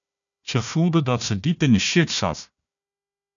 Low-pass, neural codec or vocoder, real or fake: 7.2 kHz; codec, 16 kHz, 1 kbps, FunCodec, trained on Chinese and English, 50 frames a second; fake